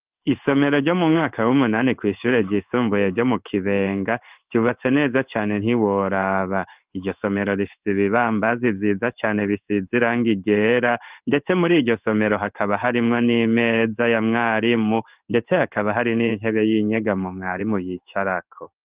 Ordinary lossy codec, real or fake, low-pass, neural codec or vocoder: Opus, 24 kbps; fake; 3.6 kHz; codec, 16 kHz in and 24 kHz out, 1 kbps, XY-Tokenizer